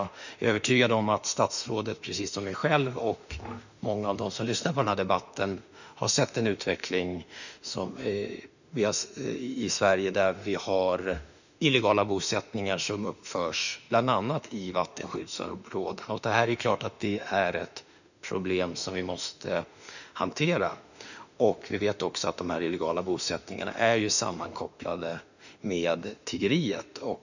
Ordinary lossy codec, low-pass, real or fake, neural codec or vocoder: none; 7.2 kHz; fake; autoencoder, 48 kHz, 32 numbers a frame, DAC-VAE, trained on Japanese speech